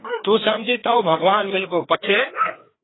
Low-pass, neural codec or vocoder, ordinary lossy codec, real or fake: 7.2 kHz; codec, 16 kHz, 2 kbps, FreqCodec, larger model; AAC, 16 kbps; fake